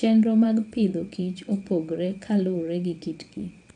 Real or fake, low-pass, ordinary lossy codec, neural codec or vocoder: fake; 9.9 kHz; none; vocoder, 22.05 kHz, 80 mel bands, WaveNeXt